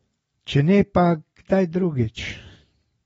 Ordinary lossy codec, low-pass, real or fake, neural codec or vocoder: AAC, 24 kbps; 19.8 kHz; real; none